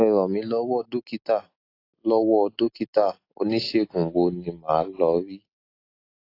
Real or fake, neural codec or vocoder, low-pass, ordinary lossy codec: real; none; 5.4 kHz; AAC, 32 kbps